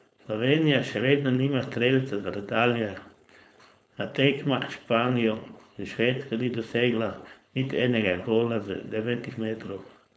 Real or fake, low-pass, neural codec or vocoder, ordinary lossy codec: fake; none; codec, 16 kHz, 4.8 kbps, FACodec; none